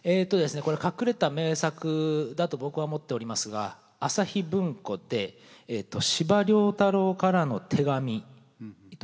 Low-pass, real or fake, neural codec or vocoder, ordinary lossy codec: none; real; none; none